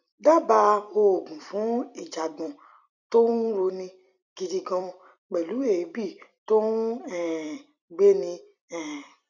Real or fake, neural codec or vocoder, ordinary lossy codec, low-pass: fake; vocoder, 44.1 kHz, 128 mel bands every 256 samples, BigVGAN v2; none; 7.2 kHz